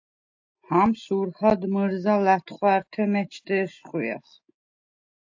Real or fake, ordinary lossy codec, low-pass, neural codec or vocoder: real; AAC, 48 kbps; 7.2 kHz; none